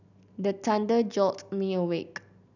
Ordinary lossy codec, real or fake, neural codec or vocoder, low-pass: none; real; none; 7.2 kHz